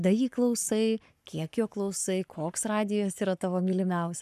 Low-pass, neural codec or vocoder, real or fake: 14.4 kHz; codec, 44.1 kHz, 7.8 kbps, Pupu-Codec; fake